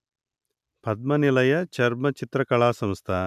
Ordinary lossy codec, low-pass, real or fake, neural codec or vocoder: none; 14.4 kHz; real; none